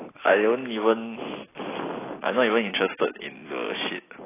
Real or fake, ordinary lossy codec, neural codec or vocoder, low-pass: real; AAC, 16 kbps; none; 3.6 kHz